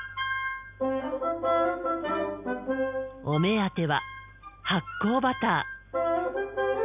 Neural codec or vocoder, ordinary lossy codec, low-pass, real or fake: none; none; 3.6 kHz; real